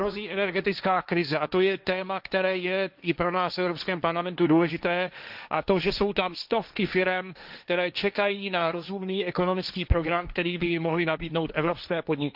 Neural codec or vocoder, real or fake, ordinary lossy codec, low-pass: codec, 16 kHz, 1.1 kbps, Voila-Tokenizer; fake; none; 5.4 kHz